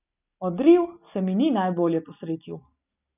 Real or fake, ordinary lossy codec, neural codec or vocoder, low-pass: real; none; none; 3.6 kHz